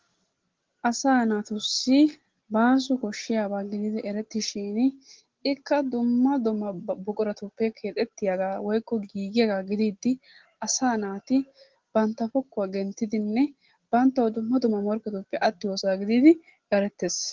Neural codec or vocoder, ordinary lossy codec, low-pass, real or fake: none; Opus, 16 kbps; 7.2 kHz; real